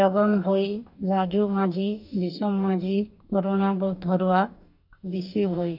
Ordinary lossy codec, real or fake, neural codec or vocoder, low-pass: none; fake; codec, 44.1 kHz, 2.6 kbps, DAC; 5.4 kHz